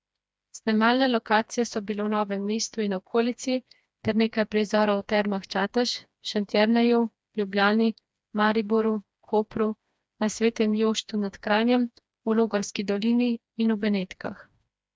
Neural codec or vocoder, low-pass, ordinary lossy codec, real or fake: codec, 16 kHz, 2 kbps, FreqCodec, smaller model; none; none; fake